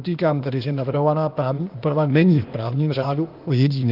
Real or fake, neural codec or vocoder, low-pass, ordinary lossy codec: fake; codec, 16 kHz, 0.8 kbps, ZipCodec; 5.4 kHz; Opus, 32 kbps